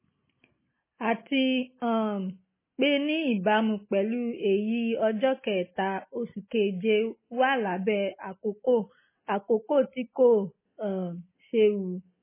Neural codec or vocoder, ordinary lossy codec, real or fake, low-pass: none; MP3, 16 kbps; real; 3.6 kHz